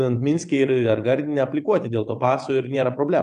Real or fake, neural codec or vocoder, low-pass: fake; vocoder, 22.05 kHz, 80 mel bands, WaveNeXt; 9.9 kHz